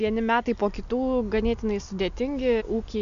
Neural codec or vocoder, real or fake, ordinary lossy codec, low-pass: none; real; AAC, 96 kbps; 7.2 kHz